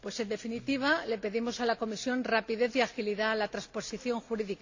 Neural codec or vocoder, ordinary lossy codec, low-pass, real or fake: none; AAC, 48 kbps; 7.2 kHz; real